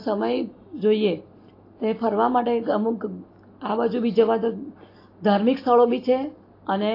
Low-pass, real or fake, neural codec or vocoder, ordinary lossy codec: 5.4 kHz; real; none; AAC, 32 kbps